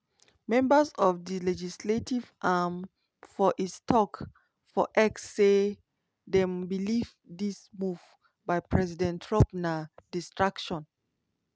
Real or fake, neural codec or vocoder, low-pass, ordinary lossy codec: real; none; none; none